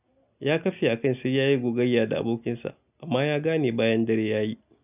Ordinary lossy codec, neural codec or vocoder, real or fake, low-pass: none; none; real; 3.6 kHz